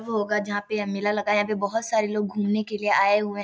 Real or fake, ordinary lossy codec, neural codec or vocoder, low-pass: real; none; none; none